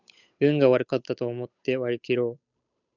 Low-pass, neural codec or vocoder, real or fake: 7.2 kHz; codec, 16 kHz, 8 kbps, FunCodec, trained on Chinese and English, 25 frames a second; fake